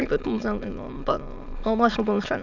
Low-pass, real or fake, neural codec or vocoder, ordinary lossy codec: 7.2 kHz; fake; autoencoder, 22.05 kHz, a latent of 192 numbers a frame, VITS, trained on many speakers; none